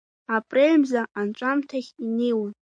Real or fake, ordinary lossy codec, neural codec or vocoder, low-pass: real; MP3, 64 kbps; none; 9.9 kHz